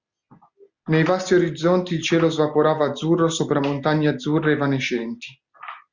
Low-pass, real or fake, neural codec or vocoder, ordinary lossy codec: 7.2 kHz; real; none; Opus, 64 kbps